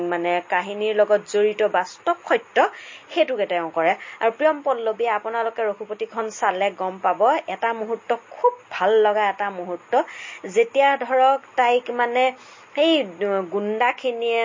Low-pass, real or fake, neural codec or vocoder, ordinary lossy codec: 7.2 kHz; real; none; MP3, 32 kbps